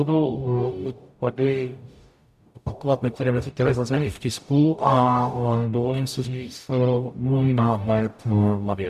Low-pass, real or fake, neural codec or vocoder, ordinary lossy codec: 14.4 kHz; fake; codec, 44.1 kHz, 0.9 kbps, DAC; MP3, 96 kbps